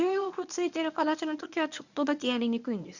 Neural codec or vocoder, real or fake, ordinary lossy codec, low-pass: codec, 24 kHz, 0.9 kbps, WavTokenizer, medium speech release version 1; fake; none; 7.2 kHz